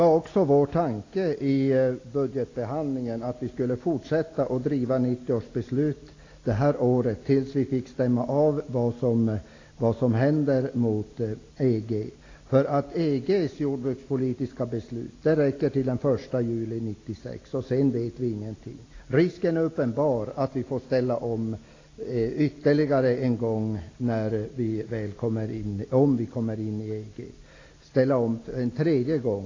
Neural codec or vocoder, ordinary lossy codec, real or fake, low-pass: none; AAC, 32 kbps; real; 7.2 kHz